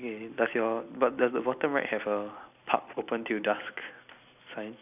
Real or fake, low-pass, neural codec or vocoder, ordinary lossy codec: real; 3.6 kHz; none; AAC, 32 kbps